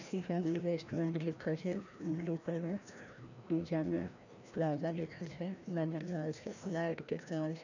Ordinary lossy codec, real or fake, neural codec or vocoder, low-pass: none; fake; codec, 16 kHz, 1 kbps, FreqCodec, larger model; 7.2 kHz